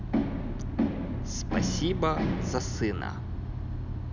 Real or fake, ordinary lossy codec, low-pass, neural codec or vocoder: real; none; 7.2 kHz; none